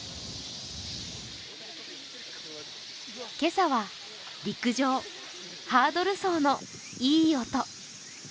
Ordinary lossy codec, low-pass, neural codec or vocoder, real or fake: none; none; none; real